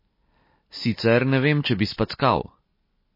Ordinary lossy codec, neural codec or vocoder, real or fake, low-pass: MP3, 24 kbps; none; real; 5.4 kHz